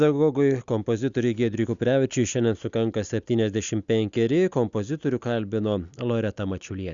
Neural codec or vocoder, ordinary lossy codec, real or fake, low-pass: none; Opus, 64 kbps; real; 7.2 kHz